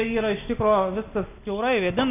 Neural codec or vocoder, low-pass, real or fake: none; 3.6 kHz; real